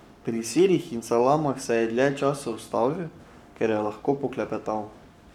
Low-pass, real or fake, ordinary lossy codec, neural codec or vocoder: 19.8 kHz; fake; none; codec, 44.1 kHz, 7.8 kbps, Pupu-Codec